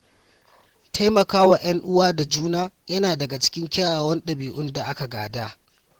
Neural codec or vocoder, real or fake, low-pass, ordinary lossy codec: vocoder, 44.1 kHz, 128 mel bands, Pupu-Vocoder; fake; 19.8 kHz; Opus, 16 kbps